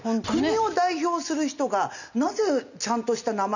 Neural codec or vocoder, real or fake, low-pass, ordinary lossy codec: none; real; 7.2 kHz; none